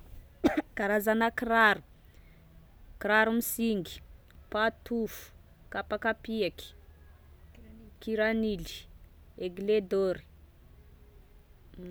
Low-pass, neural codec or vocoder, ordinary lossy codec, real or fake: none; none; none; real